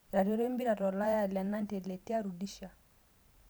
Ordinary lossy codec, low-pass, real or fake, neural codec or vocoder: none; none; fake; vocoder, 44.1 kHz, 128 mel bands every 512 samples, BigVGAN v2